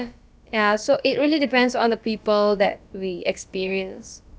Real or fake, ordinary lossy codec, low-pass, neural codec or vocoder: fake; none; none; codec, 16 kHz, about 1 kbps, DyCAST, with the encoder's durations